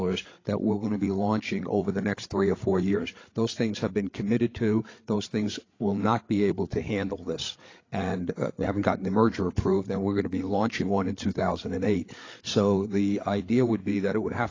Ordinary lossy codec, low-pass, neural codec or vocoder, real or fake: AAC, 32 kbps; 7.2 kHz; codec, 16 kHz, 8 kbps, FreqCodec, larger model; fake